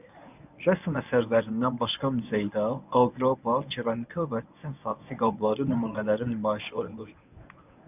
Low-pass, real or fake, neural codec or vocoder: 3.6 kHz; fake; codec, 24 kHz, 0.9 kbps, WavTokenizer, medium speech release version 1